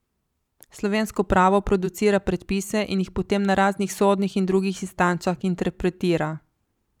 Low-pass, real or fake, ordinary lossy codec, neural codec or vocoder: 19.8 kHz; fake; none; vocoder, 44.1 kHz, 128 mel bands every 512 samples, BigVGAN v2